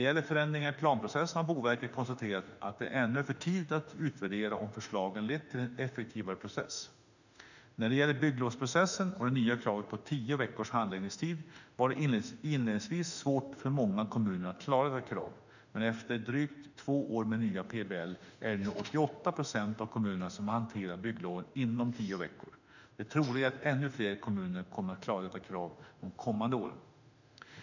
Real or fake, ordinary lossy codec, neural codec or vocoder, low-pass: fake; none; autoencoder, 48 kHz, 32 numbers a frame, DAC-VAE, trained on Japanese speech; 7.2 kHz